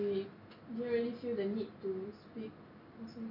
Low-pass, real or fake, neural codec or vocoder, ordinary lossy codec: 5.4 kHz; real; none; AAC, 48 kbps